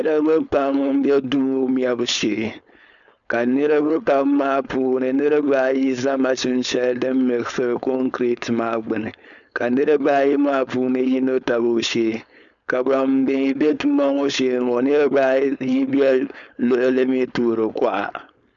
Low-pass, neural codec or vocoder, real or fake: 7.2 kHz; codec, 16 kHz, 4.8 kbps, FACodec; fake